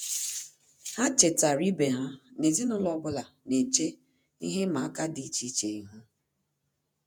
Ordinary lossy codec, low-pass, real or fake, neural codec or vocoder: none; none; fake; vocoder, 48 kHz, 128 mel bands, Vocos